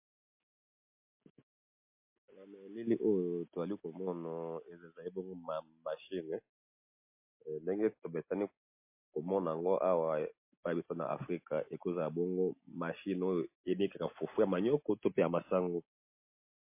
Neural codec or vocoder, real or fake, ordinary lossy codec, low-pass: none; real; MP3, 24 kbps; 3.6 kHz